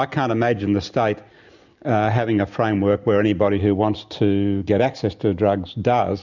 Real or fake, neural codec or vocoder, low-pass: real; none; 7.2 kHz